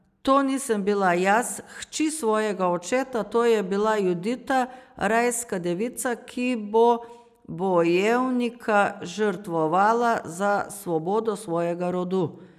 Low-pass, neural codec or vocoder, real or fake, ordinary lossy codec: 14.4 kHz; none; real; none